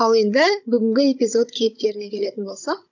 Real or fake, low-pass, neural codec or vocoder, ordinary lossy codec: fake; 7.2 kHz; codec, 16 kHz, 16 kbps, FunCodec, trained on Chinese and English, 50 frames a second; none